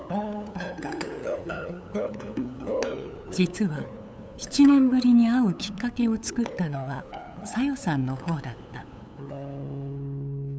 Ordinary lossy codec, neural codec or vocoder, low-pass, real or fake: none; codec, 16 kHz, 8 kbps, FunCodec, trained on LibriTTS, 25 frames a second; none; fake